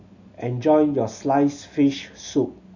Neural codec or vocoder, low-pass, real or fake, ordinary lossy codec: none; 7.2 kHz; real; none